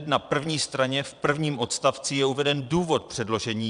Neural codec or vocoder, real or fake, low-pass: vocoder, 22.05 kHz, 80 mel bands, Vocos; fake; 9.9 kHz